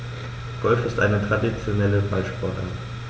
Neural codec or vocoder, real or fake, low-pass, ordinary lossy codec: none; real; none; none